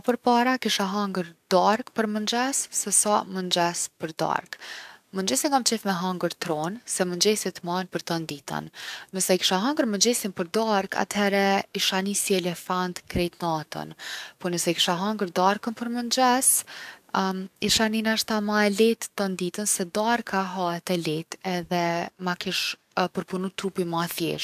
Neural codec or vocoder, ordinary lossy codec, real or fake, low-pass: codec, 44.1 kHz, 7.8 kbps, DAC; none; fake; 14.4 kHz